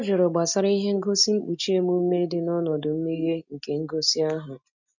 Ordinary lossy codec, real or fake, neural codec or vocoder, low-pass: none; real; none; 7.2 kHz